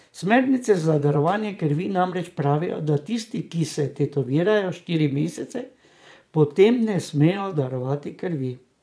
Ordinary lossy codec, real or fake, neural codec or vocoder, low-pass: none; fake; vocoder, 22.05 kHz, 80 mel bands, Vocos; none